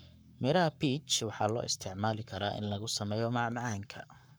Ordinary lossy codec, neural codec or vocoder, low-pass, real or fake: none; codec, 44.1 kHz, 7.8 kbps, Pupu-Codec; none; fake